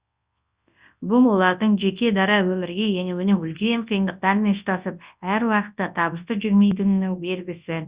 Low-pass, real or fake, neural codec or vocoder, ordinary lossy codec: 3.6 kHz; fake; codec, 24 kHz, 0.9 kbps, WavTokenizer, large speech release; Opus, 64 kbps